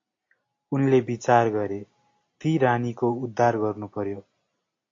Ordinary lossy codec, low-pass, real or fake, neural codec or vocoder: AAC, 48 kbps; 7.2 kHz; real; none